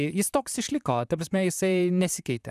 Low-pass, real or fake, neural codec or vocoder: 14.4 kHz; fake; vocoder, 44.1 kHz, 128 mel bands, Pupu-Vocoder